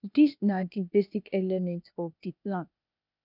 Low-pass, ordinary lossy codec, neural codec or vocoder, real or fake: 5.4 kHz; none; codec, 16 kHz, 0.8 kbps, ZipCodec; fake